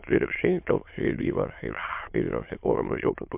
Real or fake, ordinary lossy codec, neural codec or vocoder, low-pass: fake; MP3, 32 kbps; autoencoder, 22.05 kHz, a latent of 192 numbers a frame, VITS, trained on many speakers; 3.6 kHz